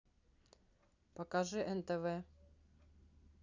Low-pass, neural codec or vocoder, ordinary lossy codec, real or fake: 7.2 kHz; none; none; real